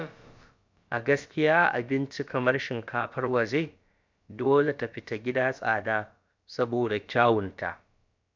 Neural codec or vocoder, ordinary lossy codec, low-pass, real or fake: codec, 16 kHz, about 1 kbps, DyCAST, with the encoder's durations; MP3, 64 kbps; 7.2 kHz; fake